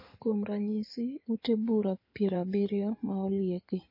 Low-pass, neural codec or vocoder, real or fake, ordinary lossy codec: 5.4 kHz; codec, 16 kHz in and 24 kHz out, 2.2 kbps, FireRedTTS-2 codec; fake; MP3, 24 kbps